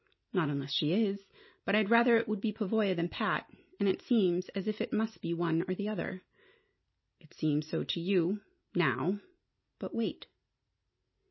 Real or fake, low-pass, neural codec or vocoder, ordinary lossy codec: real; 7.2 kHz; none; MP3, 24 kbps